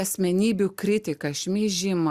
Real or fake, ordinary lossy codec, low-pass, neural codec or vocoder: real; Opus, 64 kbps; 14.4 kHz; none